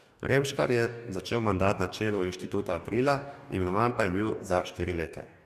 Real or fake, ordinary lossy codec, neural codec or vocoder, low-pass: fake; none; codec, 44.1 kHz, 2.6 kbps, DAC; 14.4 kHz